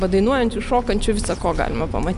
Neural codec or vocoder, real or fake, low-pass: none; real; 10.8 kHz